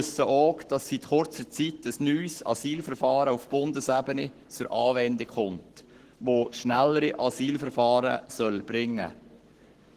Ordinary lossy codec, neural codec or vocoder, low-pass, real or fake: Opus, 16 kbps; codec, 44.1 kHz, 7.8 kbps, Pupu-Codec; 14.4 kHz; fake